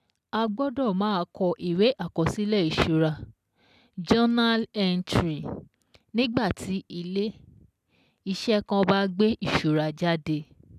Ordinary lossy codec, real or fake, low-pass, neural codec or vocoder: none; real; 14.4 kHz; none